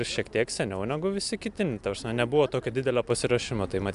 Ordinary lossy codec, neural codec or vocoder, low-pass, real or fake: MP3, 96 kbps; none; 10.8 kHz; real